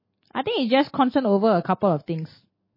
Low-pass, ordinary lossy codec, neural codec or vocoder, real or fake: 5.4 kHz; MP3, 24 kbps; none; real